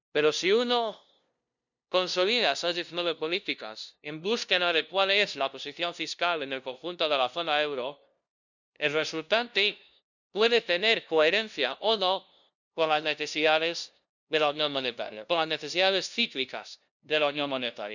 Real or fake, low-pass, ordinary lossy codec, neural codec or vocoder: fake; 7.2 kHz; none; codec, 16 kHz, 0.5 kbps, FunCodec, trained on LibriTTS, 25 frames a second